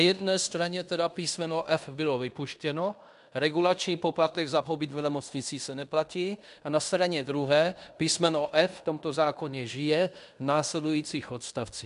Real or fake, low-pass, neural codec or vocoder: fake; 10.8 kHz; codec, 16 kHz in and 24 kHz out, 0.9 kbps, LongCat-Audio-Codec, fine tuned four codebook decoder